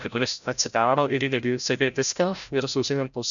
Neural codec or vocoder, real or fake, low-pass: codec, 16 kHz, 0.5 kbps, FreqCodec, larger model; fake; 7.2 kHz